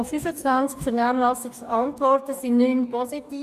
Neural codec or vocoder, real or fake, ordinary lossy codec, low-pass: codec, 44.1 kHz, 2.6 kbps, DAC; fake; none; 14.4 kHz